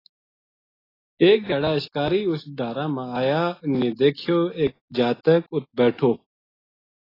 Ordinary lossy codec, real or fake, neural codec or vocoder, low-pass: AAC, 24 kbps; real; none; 5.4 kHz